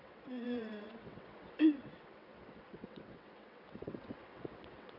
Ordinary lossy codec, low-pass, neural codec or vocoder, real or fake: none; 5.4 kHz; vocoder, 22.05 kHz, 80 mel bands, WaveNeXt; fake